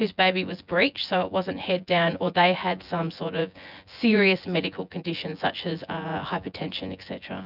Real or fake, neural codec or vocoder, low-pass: fake; vocoder, 24 kHz, 100 mel bands, Vocos; 5.4 kHz